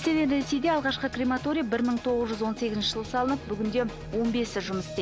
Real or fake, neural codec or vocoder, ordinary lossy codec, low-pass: real; none; none; none